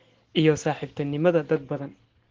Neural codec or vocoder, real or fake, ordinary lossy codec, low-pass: none; real; Opus, 16 kbps; 7.2 kHz